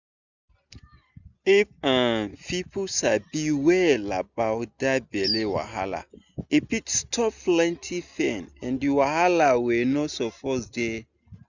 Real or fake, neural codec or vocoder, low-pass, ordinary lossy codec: real; none; 7.2 kHz; none